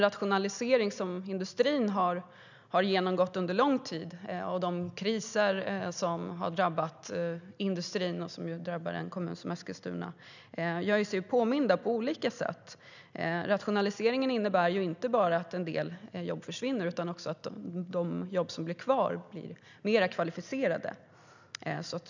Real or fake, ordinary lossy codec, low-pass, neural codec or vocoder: real; none; 7.2 kHz; none